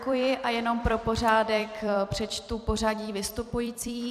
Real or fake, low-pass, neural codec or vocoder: fake; 14.4 kHz; vocoder, 44.1 kHz, 128 mel bands every 256 samples, BigVGAN v2